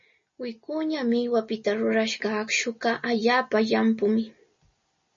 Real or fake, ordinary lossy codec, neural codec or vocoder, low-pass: real; MP3, 32 kbps; none; 7.2 kHz